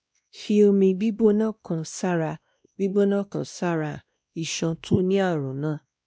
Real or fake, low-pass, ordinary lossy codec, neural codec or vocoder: fake; none; none; codec, 16 kHz, 1 kbps, X-Codec, WavLM features, trained on Multilingual LibriSpeech